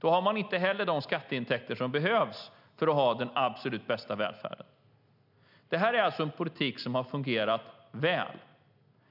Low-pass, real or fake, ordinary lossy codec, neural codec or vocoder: 5.4 kHz; real; none; none